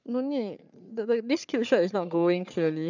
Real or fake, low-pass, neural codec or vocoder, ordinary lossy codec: fake; 7.2 kHz; codec, 44.1 kHz, 3.4 kbps, Pupu-Codec; none